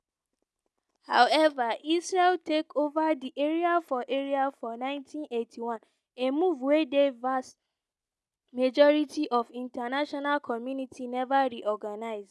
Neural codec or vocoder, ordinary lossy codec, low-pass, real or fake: none; none; none; real